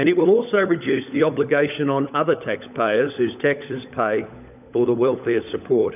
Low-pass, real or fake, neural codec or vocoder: 3.6 kHz; fake; codec, 16 kHz, 16 kbps, FunCodec, trained on LibriTTS, 50 frames a second